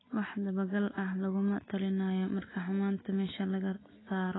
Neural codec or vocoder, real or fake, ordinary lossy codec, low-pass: none; real; AAC, 16 kbps; 7.2 kHz